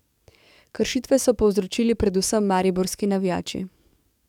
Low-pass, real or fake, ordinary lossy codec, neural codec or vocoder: 19.8 kHz; fake; none; codec, 44.1 kHz, 7.8 kbps, DAC